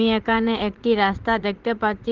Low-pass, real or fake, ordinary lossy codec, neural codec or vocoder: 7.2 kHz; fake; Opus, 16 kbps; vocoder, 44.1 kHz, 80 mel bands, Vocos